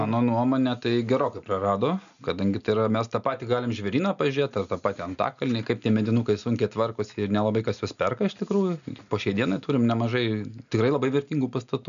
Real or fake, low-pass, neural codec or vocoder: real; 7.2 kHz; none